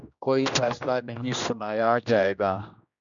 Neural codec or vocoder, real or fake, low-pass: codec, 16 kHz, 1 kbps, X-Codec, HuBERT features, trained on general audio; fake; 7.2 kHz